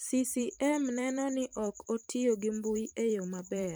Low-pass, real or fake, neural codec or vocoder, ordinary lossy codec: none; fake; vocoder, 44.1 kHz, 128 mel bands every 512 samples, BigVGAN v2; none